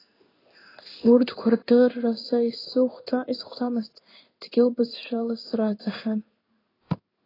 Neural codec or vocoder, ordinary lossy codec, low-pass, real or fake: codec, 16 kHz in and 24 kHz out, 1 kbps, XY-Tokenizer; AAC, 24 kbps; 5.4 kHz; fake